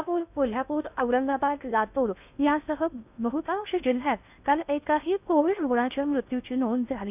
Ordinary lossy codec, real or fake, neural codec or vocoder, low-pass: none; fake; codec, 16 kHz in and 24 kHz out, 0.6 kbps, FocalCodec, streaming, 2048 codes; 3.6 kHz